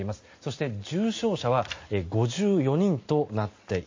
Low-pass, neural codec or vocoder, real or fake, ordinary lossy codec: 7.2 kHz; none; real; MP3, 32 kbps